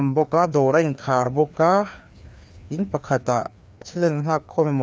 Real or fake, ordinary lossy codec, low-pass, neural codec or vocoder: fake; none; none; codec, 16 kHz, 2 kbps, FreqCodec, larger model